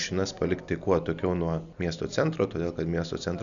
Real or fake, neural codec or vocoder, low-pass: real; none; 7.2 kHz